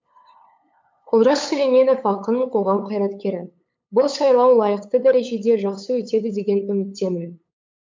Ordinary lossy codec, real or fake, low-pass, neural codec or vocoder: MP3, 64 kbps; fake; 7.2 kHz; codec, 16 kHz, 8 kbps, FunCodec, trained on LibriTTS, 25 frames a second